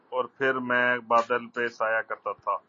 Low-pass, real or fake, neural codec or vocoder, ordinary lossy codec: 7.2 kHz; real; none; MP3, 32 kbps